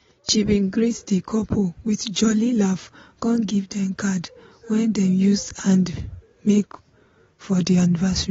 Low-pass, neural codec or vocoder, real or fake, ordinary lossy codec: 7.2 kHz; none; real; AAC, 24 kbps